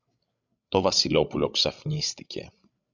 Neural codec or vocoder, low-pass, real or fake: codec, 16 kHz, 16 kbps, FreqCodec, larger model; 7.2 kHz; fake